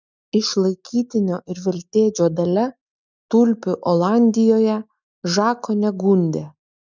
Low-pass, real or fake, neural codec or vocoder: 7.2 kHz; real; none